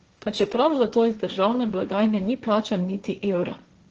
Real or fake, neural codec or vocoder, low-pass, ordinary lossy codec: fake; codec, 16 kHz, 1.1 kbps, Voila-Tokenizer; 7.2 kHz; Opus, 16 kbps